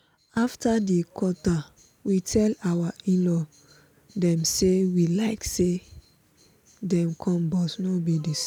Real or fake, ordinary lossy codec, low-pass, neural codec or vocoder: real; none; none; none